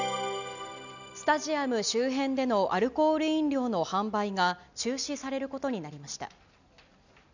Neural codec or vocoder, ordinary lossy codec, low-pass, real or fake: none; none; 7.2 kHz; real